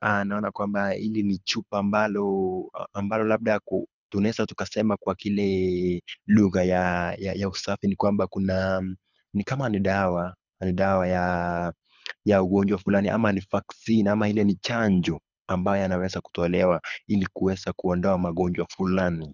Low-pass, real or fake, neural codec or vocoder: 7.2 kHz; fake; codec, 24 kHz, 6 kbps, HILCodec